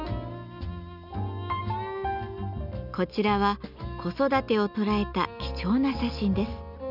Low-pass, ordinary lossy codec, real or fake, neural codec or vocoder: 5.4 kHz; none; real; none